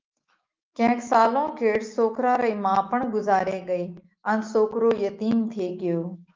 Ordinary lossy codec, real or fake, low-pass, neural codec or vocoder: Opus, 24 kbps; fake; 7.2 kHz; autoencoder, 48 kHz, 128 numbers a frame, DAC-VAE, trained on Japanese speech